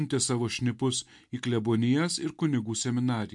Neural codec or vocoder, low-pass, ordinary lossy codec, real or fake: none; 10.8 kHz; MP3, 64 kbps; real